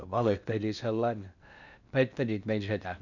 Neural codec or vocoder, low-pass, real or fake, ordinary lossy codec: codec, 16 kHz in and 24 kHz out, 0.8 kbps, FocalCodec, streaming, 65536 codes; 7.2 kHz; fake; none